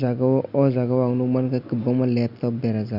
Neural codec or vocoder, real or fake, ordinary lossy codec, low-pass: none; real; none; 5.4 kHz